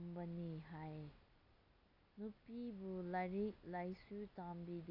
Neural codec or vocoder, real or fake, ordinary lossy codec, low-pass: none; real; AAC, 48 kbps; 5.4 kHz